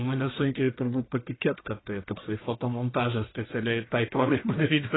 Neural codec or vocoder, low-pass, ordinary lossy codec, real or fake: codec, 44.1 kHz, 1.7 kbps, Pupu-Codec; 7.2 kHz; AAC, 16 kbps; fake